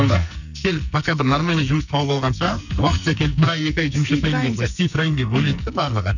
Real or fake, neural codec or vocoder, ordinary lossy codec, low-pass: fake; codec, 44.1 kHz, 2.6 kbps, SNAC; none; 7.2 kHz